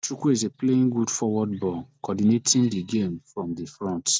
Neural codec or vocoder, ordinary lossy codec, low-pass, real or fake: none; none; none; real